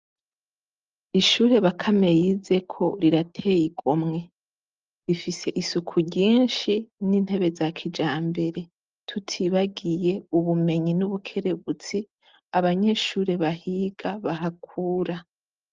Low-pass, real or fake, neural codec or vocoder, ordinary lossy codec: 7.2 kHz; real; none; Opus, 24 kbps